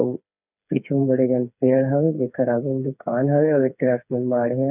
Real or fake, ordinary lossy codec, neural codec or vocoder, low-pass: fake; none; codec, 16 kHz, 4 kbps, FreqCodec, smaller model; 3.6 kHz